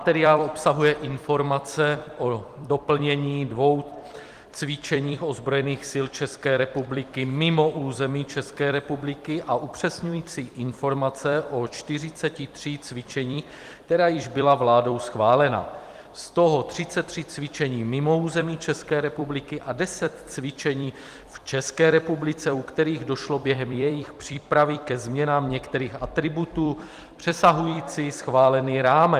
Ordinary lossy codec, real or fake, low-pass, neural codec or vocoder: Opus, 24 kbps; fake; 14.4 kHz; vocoder, 44.1 kHz, 128 mel bands every 256 samples, BigVGAN v2